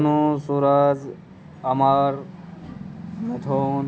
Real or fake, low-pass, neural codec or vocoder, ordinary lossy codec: real; none; none; none